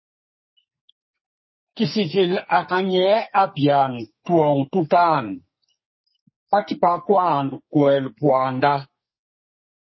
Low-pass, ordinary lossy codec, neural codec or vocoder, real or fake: 7.2 kHz; MP3, 24 kbps; codec, 44.1 kHz, 2.6 kbps, SNAC; fake